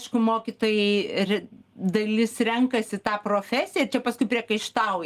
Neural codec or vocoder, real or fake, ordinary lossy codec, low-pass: none; real; Opus, 24 kbps; 14.4 kHz